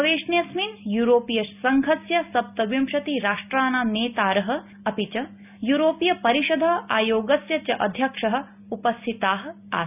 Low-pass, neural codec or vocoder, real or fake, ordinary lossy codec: 3.6 kHz; none; real; none